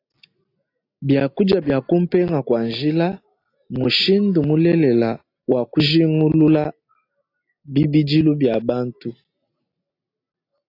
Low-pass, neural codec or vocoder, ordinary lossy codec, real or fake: 5.4 kHz; none; AAC, 32 kbps; real